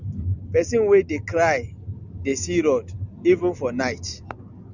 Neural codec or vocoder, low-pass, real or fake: none; 7.2 kHz; real